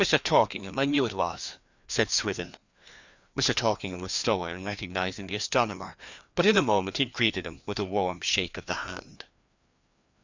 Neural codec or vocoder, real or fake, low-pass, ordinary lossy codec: codec, 16 kHz, 2 kbps, FreqCodec, larger model; fake; 7.2 kHz; Opus, 64 kbps